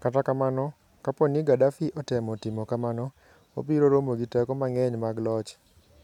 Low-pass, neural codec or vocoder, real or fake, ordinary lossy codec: 19.8 kHz; none; real; none